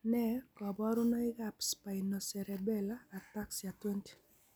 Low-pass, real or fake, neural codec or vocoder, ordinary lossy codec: none; real; none; none